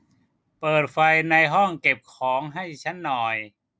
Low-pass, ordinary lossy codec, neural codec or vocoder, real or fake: none; none; none; real